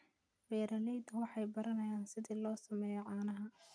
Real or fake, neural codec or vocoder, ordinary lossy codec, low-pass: real; none; none; none